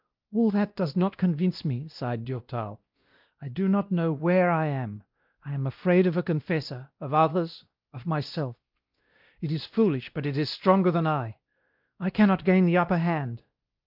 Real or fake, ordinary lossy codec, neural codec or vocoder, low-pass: fake; Opus, 24 kbps; codec, 16 kHz, 1 kbps, X-Codec, WavLM features, trained on Multilingual LibriSpeech; 5.4 kHz